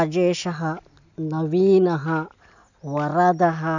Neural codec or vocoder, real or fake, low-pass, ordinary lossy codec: none; real; 7.2 kHz; none